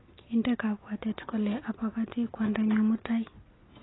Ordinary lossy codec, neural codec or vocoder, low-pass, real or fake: AAC, 16 kbps; none; 7.2 kHz; real